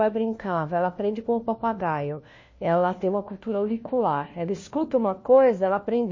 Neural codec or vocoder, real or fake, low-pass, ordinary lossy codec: codec, 16 kHz, 1 kbps, FunCodec, trained on LibriTTS, 50 frames a second; fake; 7.2 kHz; MP3, 32 kbps